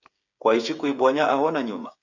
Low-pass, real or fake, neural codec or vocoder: 7.2 kHz; fake; codec, 16 kHz, 8 kbps, FreqCodec, smaller model